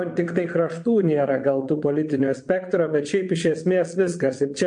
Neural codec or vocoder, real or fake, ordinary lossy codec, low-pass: vocoder, 22.05 kHz, 80 mel bands, Vocos; fake; MP3, 48 kbps; 9.9 kHz